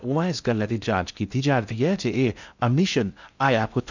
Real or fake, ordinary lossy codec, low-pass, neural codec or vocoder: fake; none; 7.2 kHz; codec, 16 kHz in and 24 kHz out, 0.6 kbps, FocalCodec, streaming, 2048 codes